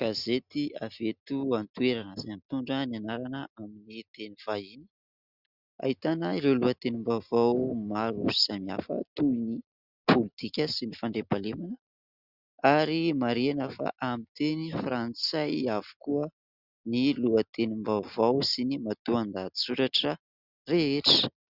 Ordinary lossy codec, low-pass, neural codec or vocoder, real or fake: Opus, 64 kbps; 5.4 kHz; none; real